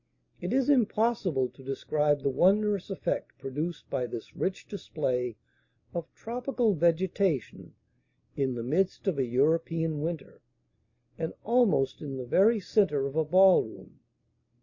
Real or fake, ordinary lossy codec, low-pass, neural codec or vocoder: real; MP3, 32 kbps; 7.2 kHz; none